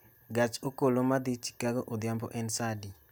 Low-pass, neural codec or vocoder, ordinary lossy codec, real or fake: none; none; none; real